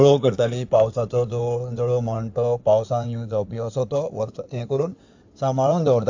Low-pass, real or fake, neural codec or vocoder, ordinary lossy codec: 7.2 kHz; fake; codec, 16 kHz in and 24 kHz out, 2.2 kbps, FireRedTTS-2 codec; AAC, 48 kbps